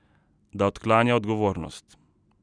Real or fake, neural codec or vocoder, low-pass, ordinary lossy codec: real; none; 9.9 kHz; none